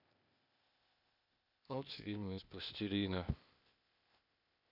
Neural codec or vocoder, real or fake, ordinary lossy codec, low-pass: codec, 16 kHz, 0.8 kbps, ZipCodec; fake; none; 5.4 kHz